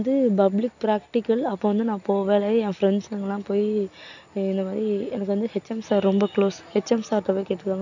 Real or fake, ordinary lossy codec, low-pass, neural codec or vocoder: real; none; 7.2 kHz; none